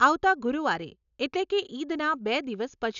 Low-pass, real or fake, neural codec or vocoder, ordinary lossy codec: 7.2 kHz; real; none; none